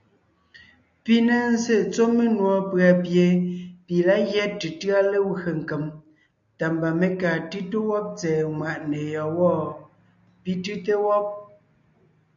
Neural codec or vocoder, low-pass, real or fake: none; 7.2 kHz; real